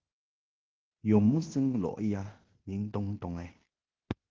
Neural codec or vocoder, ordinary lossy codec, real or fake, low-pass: codec, 16 kHz in and 24 kHz out, 0.9 kbps, LongCat-Audio-Codec, fine tuned four codebook decoder; Opus, 16 kbps; fake; 7.2 kHz